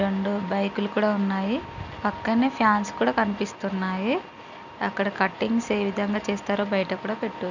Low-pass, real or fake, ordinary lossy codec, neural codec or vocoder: 7.2 kHz; real; none; none